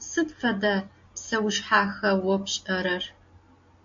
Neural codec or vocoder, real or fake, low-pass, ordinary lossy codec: none; real; 7.2 kHz; MP3, 48 kbps